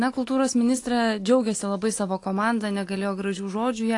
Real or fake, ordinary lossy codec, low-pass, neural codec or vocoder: real; AAC, 48 kbps; 10.8 kHz; none